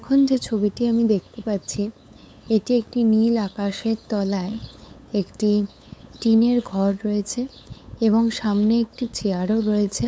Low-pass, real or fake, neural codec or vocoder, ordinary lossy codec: none; fake; codec, 16 kHz, 8 kbps, FunCodec, trained on LibriTTS, 25 frames a second; none